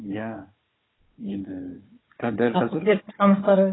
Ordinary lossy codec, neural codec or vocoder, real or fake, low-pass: AAC, 16 kbps; codec, 16 kHz, 4 kbps, FunCodec, trained on Chinese and English, 50 frames a second; fake; 7.2 kHz